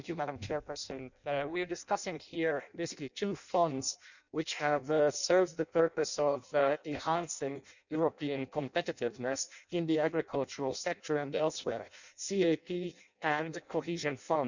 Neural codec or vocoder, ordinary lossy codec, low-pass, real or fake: codec, 16 kHz in and 24 kHz out, 0.6 kbps, FireRedTTS-2 codec; none; 7.2 kHz; fake